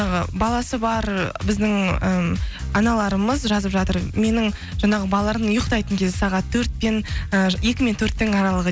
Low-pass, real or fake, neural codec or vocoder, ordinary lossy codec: none; real; none; none